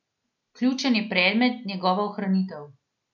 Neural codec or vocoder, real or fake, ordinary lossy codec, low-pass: none; real; none; 7.2 kHz